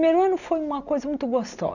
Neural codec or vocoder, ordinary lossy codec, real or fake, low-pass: none; none; real; 7.2 kHz